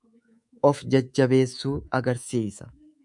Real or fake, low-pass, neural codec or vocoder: fake; 10.8 kHz; codec, 24 kHz, 3.1 kbps, DualCodec